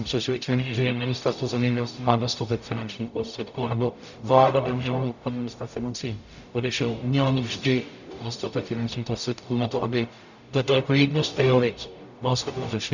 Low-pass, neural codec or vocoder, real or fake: 7.2 kHz; codec, 44.1 kHz, 0.9 kbps, DAC; fake